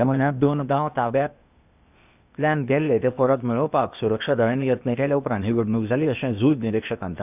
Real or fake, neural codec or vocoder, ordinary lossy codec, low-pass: fake; codec, 16 kHz, 0.8 kbps, ZipCodec; none; 3.6 kHz